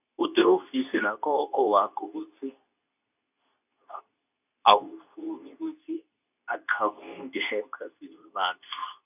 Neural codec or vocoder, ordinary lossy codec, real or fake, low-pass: codec, 24 kHz, 0.9 kbps, WavTokenizer, medium speech release version 2; none; fake; 3.6 kHz